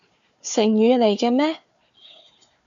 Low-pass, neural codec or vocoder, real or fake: 7.2 kHz; codec, 16 kHz, 4 kbps, FunCodec, trained on Chinese and English, 50 frames a second; fake